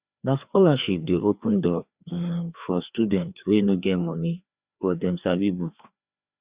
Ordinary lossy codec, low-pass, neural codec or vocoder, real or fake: Opus, 64 kbps; 3.6 kHz; codec, 16 kHz, 2 kbps, FreqCodec, larger model; fake